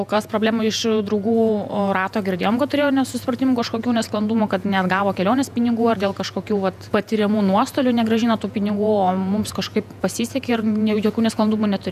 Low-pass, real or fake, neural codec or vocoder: 14.4 kHz; fake; vocoder, 48 kHz, 128 mel bands, Vocos